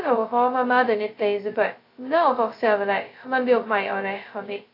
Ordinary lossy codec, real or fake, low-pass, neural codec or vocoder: AAC, 32 kbps; fake; 5.4 kHz; codec, 16 kHz, 0.2 kbps, FocalCodec